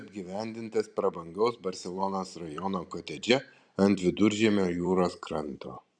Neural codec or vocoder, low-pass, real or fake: vocoder, 44.1 kHz, 128 mel bands every 512 samples, BigVGAN v2; 9.9 kHz; fake